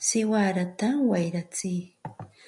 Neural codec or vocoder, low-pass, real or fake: none; 10.8 kHz; real